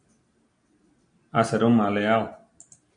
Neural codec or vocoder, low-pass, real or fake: none; 9.9 kHz; real